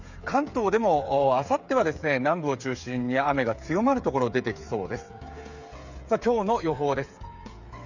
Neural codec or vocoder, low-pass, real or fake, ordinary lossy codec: codec, 16 kHz, 8 kbps, FreqCodec, smaller model; 7.2 kHz; fake; none